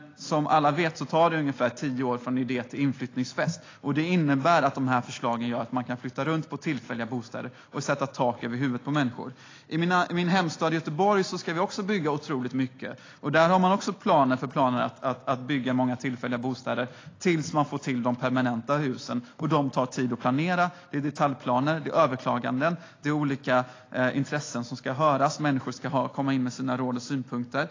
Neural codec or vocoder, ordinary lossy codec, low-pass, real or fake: none; AAC, 32 kbps; 7.2 kHz; real